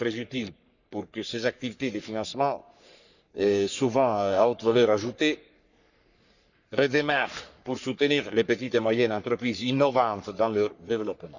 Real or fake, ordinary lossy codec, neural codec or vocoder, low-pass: fake; none; codec, 44.1 kHz, 3.4 kbps, Pupu-Codec; 7.2 kHz